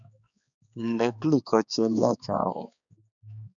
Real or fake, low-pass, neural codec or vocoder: fake; 7.2 kHz; codec, 16 kHz, 2 kbps, X-Codec, HuBERT features, trained on balanced general audio